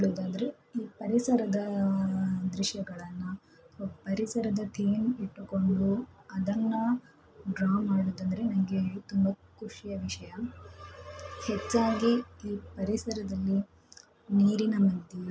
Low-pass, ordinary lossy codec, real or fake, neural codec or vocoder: none; none; real; none